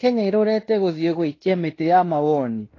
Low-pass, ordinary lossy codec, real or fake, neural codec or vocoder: 7.2 kHz; AAC, 32 kbps; fake; codec, 16 kHz in and 24 kHz out, 0.9 kbps, LongCat-Audio-Codec, fine tuned four codebook decoder